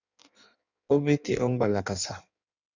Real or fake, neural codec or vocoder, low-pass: fake; codec, 16 kHz in and 24 kHz out, 1.1 kbps, FireRedTTS-2 codec; 7.2 kHz